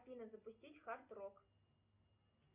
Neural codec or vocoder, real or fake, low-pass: none; real; 3.6 kHz